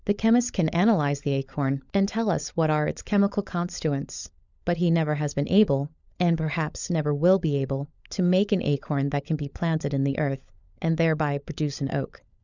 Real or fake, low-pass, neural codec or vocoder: fake; 7.2 kHz; codec, 16 kHz, 16 kbps, FunCodec, trained on LibriTTS, 50 frames a second